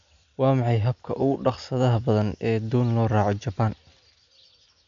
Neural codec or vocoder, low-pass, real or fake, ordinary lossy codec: none; 7.2 kHz; real; AAC, 64 kbps